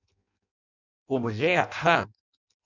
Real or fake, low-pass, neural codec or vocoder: fake; 7.2 kHz; codec, 16 kHz in and 24 kHz out, 0.6 kbps, FireRedTTS-2 codec